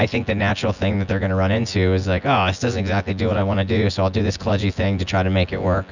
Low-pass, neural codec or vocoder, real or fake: 7.2 kHz; vocoder, 24 kHz, 100 mel bands, Vocos; fake